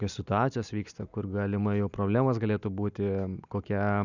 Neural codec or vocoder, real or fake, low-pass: none; real; 7.2 kHz